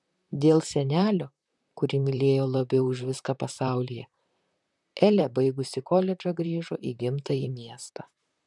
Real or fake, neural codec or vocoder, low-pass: fake; vocoder, 44.1 kHz, 128 mel bands, Pupu-Vocoder; 10.8 kHz